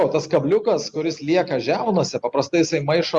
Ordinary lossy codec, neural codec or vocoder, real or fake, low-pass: Opus, 64 kbps; none; real; 10.8 kHz